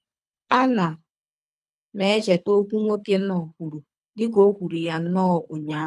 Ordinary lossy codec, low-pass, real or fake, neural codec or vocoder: none; none; fake; codec, 24 kHz, 3 kbps, HILCodec